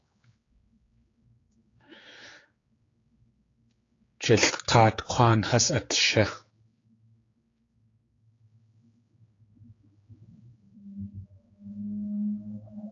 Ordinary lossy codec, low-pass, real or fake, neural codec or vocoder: AAC, 32 kbps; 7.2 kHz; fake; codec, 16 kHz, 4 kbps, X-Codec, HuBERT features, trained on general audio